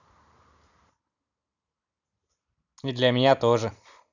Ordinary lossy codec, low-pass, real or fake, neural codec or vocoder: none; 7.2 kHz; real; none